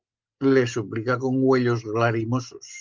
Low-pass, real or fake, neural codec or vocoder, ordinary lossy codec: 7.2 kHz; real; none; Opus, 32 kbps